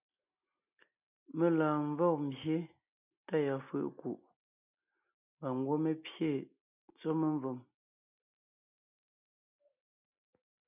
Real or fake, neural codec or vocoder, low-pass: real; none; 3.6 kHz